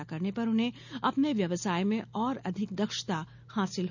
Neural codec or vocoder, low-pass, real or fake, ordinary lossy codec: none; 7.2 kHz; real; none